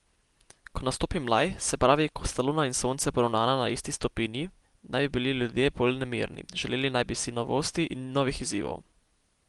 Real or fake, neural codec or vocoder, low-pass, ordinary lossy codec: real; none; 10.8 kHz; Opus, 24 kbps